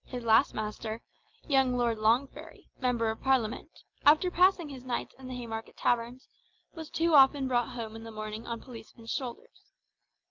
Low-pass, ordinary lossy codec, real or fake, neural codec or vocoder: 7.2 kHz; Opus, 32 kbps; real; none